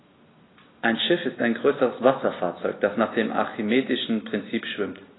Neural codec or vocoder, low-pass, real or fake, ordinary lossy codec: none; 7.2 kHz; real; AAC, 16 kbps